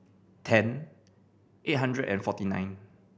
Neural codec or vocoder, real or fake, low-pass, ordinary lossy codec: none; real; none; none